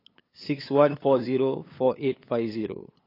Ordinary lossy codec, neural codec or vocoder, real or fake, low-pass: AAC, 24 kbps; codec, 16 kHz, 8 kbps, FunCodec, trained on LibriTTS, 25 frames a second; fake; 5.4 kHz